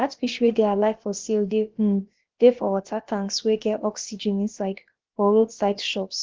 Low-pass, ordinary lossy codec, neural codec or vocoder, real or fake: 7.2 kHz; Opus, 16 kbps; codec, 16 kHz, about 1 kbps, DyCAST, with the encoder's durations; fake